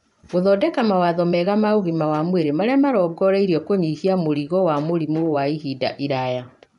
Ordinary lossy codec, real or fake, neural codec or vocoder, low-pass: MP3, 96 kbps; fake; vocoder, 24 kHz, 100 mel bands, Vocos; 10.8 kHz